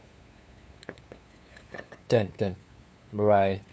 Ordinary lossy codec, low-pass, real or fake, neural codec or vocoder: none; none; fake; codec, 16 kHz, 8 kbps, FunCodec, trained on LibriTTS, 25 frames a second